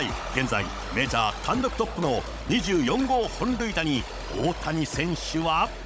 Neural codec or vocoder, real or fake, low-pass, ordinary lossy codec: codec, 16 kHz, 16 kbps, FunCodec, trained on Chinese and English, 50 frames a second; fake; none; none